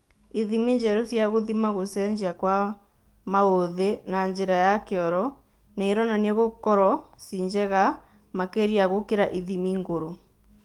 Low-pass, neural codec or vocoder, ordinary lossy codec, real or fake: 19.8 kHz; codec, 44.1 kHz, 7.8 kbps, DAC; Opus, 32 kbps; fake